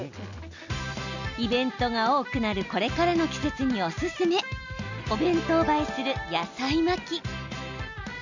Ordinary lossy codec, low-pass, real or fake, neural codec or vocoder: none; 7.2 kHz; real; none